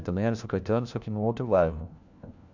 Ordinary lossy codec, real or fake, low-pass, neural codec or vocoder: none; fake; 7.2 kHz; codec, 16 kHz, 1 kbps, FunCodec, trained on LibriTTS, 50 frames a second